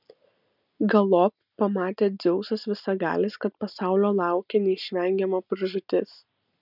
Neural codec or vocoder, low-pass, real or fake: none; 5.4 kHz; real